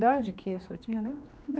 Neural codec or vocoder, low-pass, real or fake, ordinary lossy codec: codec, 16 kHz, 2 kbps, X-Codec, HuBERT features, trained on general audio; none; fake; none